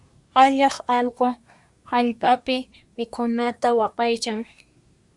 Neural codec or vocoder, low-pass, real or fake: codec, 24 kHz, 1 kbps, SNAC; 10.8 kHz; fake